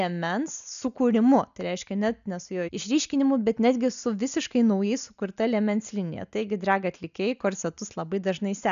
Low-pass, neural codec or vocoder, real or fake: 7.2 kHz; none; real